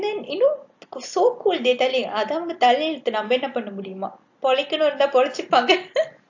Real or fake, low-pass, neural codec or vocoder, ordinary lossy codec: real; 7.2 kHz; none; AAC, 48 kbps